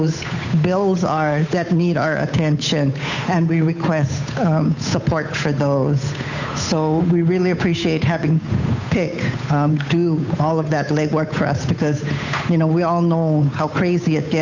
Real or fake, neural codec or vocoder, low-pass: fake; codec, 16 kHz, 8 kbps, FunCodec, trained on Chinese and English, 25 frames a second; 7.2 kHz